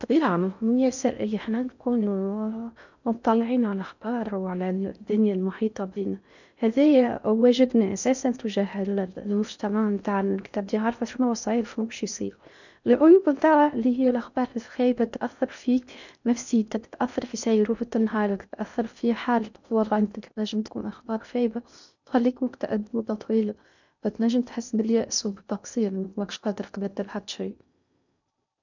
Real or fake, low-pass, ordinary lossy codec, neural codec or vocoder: fake; 7.2 kHz; none; codec, 16 kHz in and 24 kHz out, 0.6 kbps, FocalCodec, streaming, 4096 codes